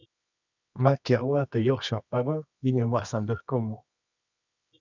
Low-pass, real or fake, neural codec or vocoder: 7.2 kHz; fake; codec, 24 kHz, 0.9 kbps, WavTokenizer, medium music audio release